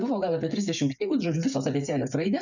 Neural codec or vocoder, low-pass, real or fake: codec, 16 kHz, 8 kbps, FreqCodec, smaller model; 7.2 kHz; fake